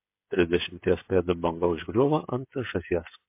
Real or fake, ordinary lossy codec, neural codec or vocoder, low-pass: fake; MP3, 24 kbps; codec, 16 kHz, 16 kbps, FreqCodec, smaller model; 3.6 kHz